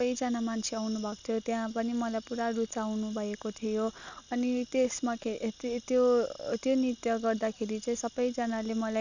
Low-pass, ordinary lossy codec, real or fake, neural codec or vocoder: 7.2 kHz; none; real; none